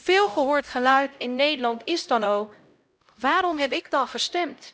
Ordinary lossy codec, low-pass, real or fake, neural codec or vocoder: none; none; fake; codec, 16 kHz, 0.5 kbps, X-Codec, HuBERT features, trained on LibriSpeech